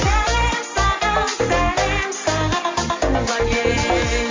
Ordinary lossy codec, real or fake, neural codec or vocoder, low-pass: MP3, 48 kbps; real; none; 7.2 kHz